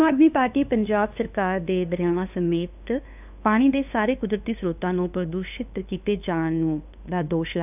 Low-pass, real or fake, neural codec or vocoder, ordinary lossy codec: 3.6 kHz; fake; codec, 16 kHz, 2 kbps, FunCodec, trained on LibriTTS, 25 frames a second; none